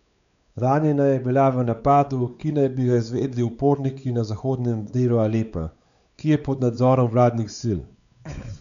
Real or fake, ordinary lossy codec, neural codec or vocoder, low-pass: fake; none; codec, 16 kHz, 4 kbps, X-Codec, WavLM features, trained on Multilingual LibriSpeech; 7.2 kHz